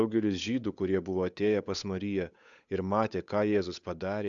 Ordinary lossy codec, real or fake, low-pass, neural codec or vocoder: MP3, 96 kbps; fake; 7.2 kHz; codec, 16 kHz, 8 kbps, FunCodec, trained on Chinese and English, 25 frames a second